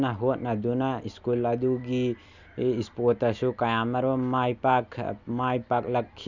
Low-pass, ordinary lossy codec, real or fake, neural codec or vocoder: 7.2 kHz; none; real; none